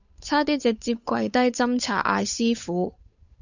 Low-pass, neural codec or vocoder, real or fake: 7.2 kHz; codec, 16 kHz, 8 kbps, FunCodec, trained on Chinese and English, 25 frames a second; fake